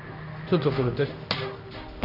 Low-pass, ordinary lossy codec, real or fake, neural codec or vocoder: 5.4 kHz; none; fake; codec, 16 kHz in and 24 kHz out, 1 kbps, XY-Tokenizer